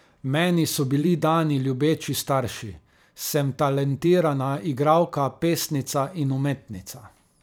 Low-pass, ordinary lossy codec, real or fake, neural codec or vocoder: none; none; fake; vocoder, 44.1 kHz, 128 mel bands every 512 samples, BigVGAN v2